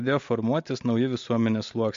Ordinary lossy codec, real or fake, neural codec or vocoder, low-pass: MP3, 48 kbps; real; none; 7.2 kHz